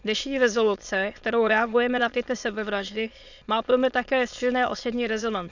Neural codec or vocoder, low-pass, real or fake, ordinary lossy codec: autoencoder, 22.05 kHz, a latent of 192 numbers a frame, VITS, trained on many speakers; 7.2 kHz; fake; none